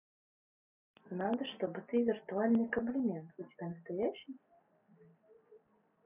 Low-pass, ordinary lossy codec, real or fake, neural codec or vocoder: 3.6 kHz; none; real; none